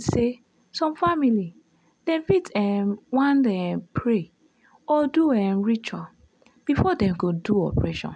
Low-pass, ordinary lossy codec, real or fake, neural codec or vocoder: 9.9 kHz; none; real; none